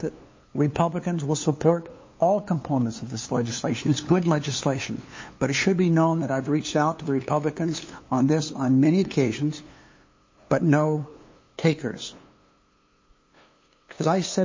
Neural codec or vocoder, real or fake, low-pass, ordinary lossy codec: codec, 16 kHz, 2 kbps, FunCodec, trained on LibriTTS, 25 frames a second; fake; 7.2 kHz; MP3, 32 kbps